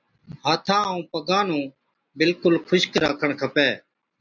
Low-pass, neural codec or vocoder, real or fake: 7.2 kHz; none; real